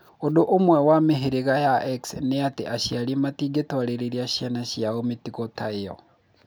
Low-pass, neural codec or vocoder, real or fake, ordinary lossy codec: none; none; real; none